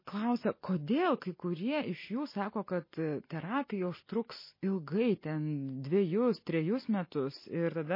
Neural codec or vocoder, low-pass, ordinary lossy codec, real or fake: none; 5.4 kHz; MP3, 24 kbps; real